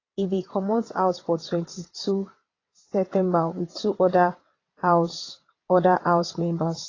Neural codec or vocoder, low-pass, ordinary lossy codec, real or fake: vocoder, 22.05 kHz, 80 mel bands, WaveNeXt; 7.2 kHz; AAC, 32 kbps; fake